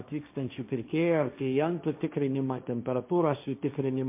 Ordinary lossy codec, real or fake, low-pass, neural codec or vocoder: MP3, 32 kbps; fake; 3.6 kHz; codec, 16 kHz, 1.1 kbps, Voila-Tokenizer